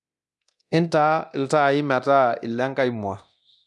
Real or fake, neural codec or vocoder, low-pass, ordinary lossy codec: fake; codec, 24 kHz, 0.9 kbps, DualCodec; none; none